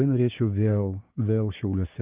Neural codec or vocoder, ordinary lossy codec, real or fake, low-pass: codec, 16 kHz, 6 kbps, DAC; Opus, 32 kbps; fake; 3.6 kHz